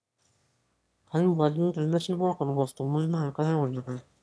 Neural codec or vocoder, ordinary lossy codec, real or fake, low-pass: autoencoder, 22.05 kHz, a latent of 192 numbers a frame, VITS, trained on one speaker; none; fake; none